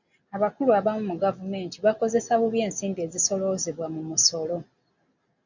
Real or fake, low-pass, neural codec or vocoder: real; 7.2 kHz; none